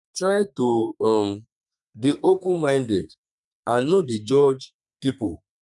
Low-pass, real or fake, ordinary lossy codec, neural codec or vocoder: 10.8 kHz; fake; none; codec, 32 kHz, 1.9 kbps, SNAC